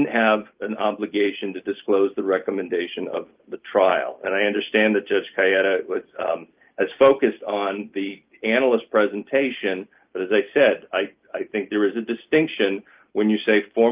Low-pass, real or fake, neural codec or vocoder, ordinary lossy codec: 3.6 kHz; real; none; Opus, 24 kbps